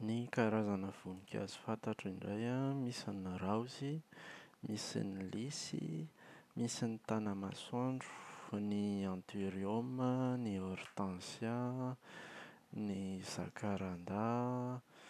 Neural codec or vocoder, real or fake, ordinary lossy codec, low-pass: none; real; none; 14.4 kHz